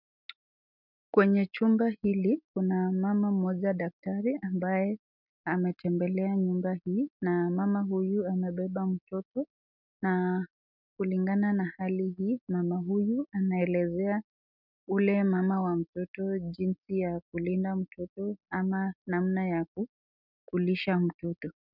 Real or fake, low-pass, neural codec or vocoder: real; 5.4 kHz; none